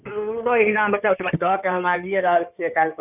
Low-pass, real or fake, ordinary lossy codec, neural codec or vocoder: 3.6 kHz; fake; none; codec, 16 kHz in and 24 kHz out, 2.2 kbps, FireRedTTS-2 codec